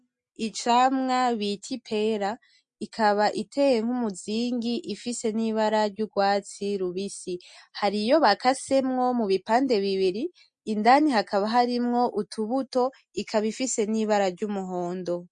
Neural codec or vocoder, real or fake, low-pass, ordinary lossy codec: none; real; 9.9 kHz; MP3, 48 kbps